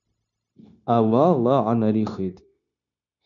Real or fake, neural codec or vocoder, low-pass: fake; codec, 16 kHz, 0.9 kbps, LongCat-Audio-Codec; 7.2 kHz